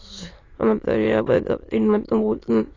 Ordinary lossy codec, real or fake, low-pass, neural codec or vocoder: AAC, 32 kbps; fake; 7.2 kHz; autoencoder, 22.05 kHz, a latent of 192 numbers a frame, VITS, trained on many speakers